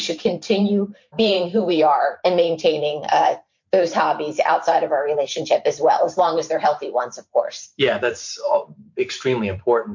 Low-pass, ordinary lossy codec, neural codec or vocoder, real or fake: 7.2 kHz; MP3, 48 kbps; vocoder, 44.1 kHz, 128 mel bands every 512 samples, BigVGAN v2; fake